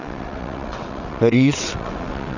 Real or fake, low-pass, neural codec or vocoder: fake; 7.2 kHz; vocoder, 22.05 kHz, 80 mel bands, WaveNeXt